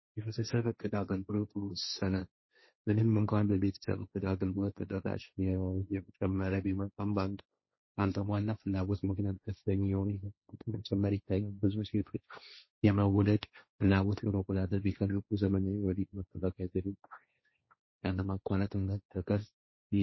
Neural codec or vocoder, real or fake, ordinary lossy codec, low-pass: codec, 16 kHz, 1.1 kbps, Voila-Tokenizer; fake; MP3, 24 kbps; 7.2 kHz